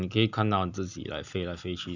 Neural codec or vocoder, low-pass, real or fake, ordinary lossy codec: none; 7.2 kHz; real; none